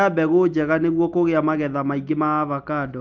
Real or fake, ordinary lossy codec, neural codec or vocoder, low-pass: real; Opus, 24 kbps; none; 7.2 kHz